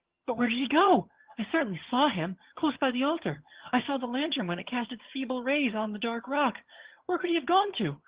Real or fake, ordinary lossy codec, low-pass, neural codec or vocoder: fake; Opus, 16 kbps; 3.6 kHz; vocoder, 22.05 kHz, 80 mel bands, HiFi-GAN